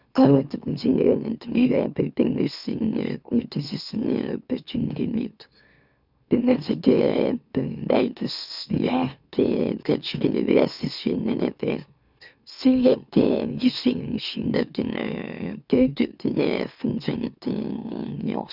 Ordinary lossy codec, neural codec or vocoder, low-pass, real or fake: none; autoencoder, 44.1 kHz, a latent of 192 numbers a frame, MeloTTS; 5.4 kHz; fake